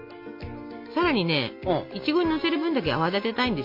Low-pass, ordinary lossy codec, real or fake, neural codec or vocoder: 5.4 kHz; MP3, 32 kbps; real; none